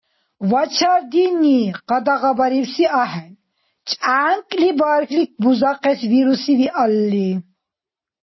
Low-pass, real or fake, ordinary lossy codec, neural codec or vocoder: 7.2 kHz; real; MP3, 24 kbps; none